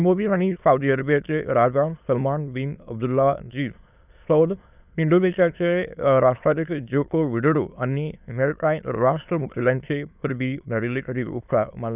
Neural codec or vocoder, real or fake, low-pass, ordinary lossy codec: autoencoder, 22.05 kHz, a latent of 192 numbers a frame, VITS, trained on many speakers; fake; 3.6 kHz; none